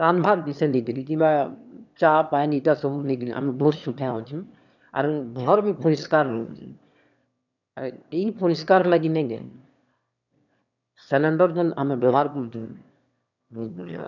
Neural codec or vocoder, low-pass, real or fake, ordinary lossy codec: autoencoder, 22.05 kHz, a latent of 192 numbers a frame, VITS, trained on one speaker; 7.2 kHz; fake; none